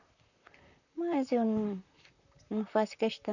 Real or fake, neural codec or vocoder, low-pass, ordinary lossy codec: fake; vocoder, 44.1 kHz, 128 mel bands, Pupu-Vocoder; 7.2 kHz; none